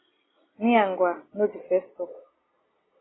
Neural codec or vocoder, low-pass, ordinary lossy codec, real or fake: none; 7.2 kHz; AAC, 16 kbps; real